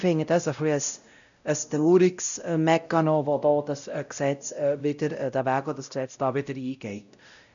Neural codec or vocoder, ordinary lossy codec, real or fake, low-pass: codec, 16 kHz, 0.5 kbps, X-Codec, WavLM features, trained on Multilingual LibriSpeech; none; fake; 7.2 kHz